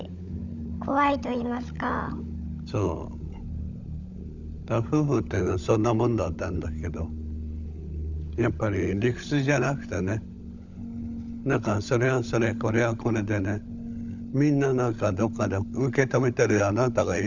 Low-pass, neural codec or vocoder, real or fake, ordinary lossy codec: 7.2 kHz; codec, 16 kHz, 16 kbps, FunCodec, trained on LibriTTS, 50 frames a second; fake; none